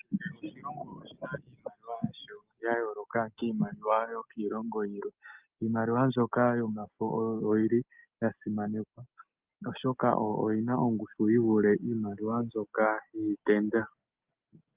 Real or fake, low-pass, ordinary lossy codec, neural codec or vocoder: real; 3.6 kHz; Opus, 32 kbps; none